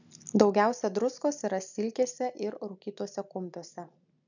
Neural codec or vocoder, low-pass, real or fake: none; 7.2 kHz; real